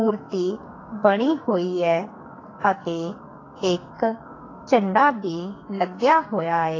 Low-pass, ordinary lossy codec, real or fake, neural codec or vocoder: 7.2 kHz; AAC, 32 kbps; fake; codec, 44.1 kHz, 2.6 kbps, SNAC